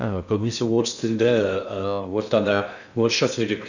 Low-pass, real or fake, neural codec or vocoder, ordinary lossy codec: 7.2 kHz; fake; codec, 16 kHz in and 24 kHz out, 0.6 kbps, FocalCodec, streaming, 2048 codes; none